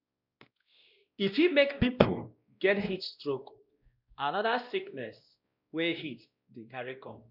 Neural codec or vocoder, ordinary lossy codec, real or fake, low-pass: codec, 16 kHz, 1 kbps, X-Codec, WavLM features, trained on Multilingual LibriSpeech; none; fake; 5.4 kHz